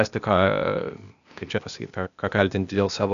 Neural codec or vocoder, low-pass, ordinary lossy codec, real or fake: codec, 16 kHz, 0.8 kbps, ZipCodec; 7.2 kHz; MP3, 96 kbps; fake